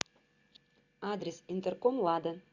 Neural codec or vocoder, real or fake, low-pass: none; real; 7.2 kHz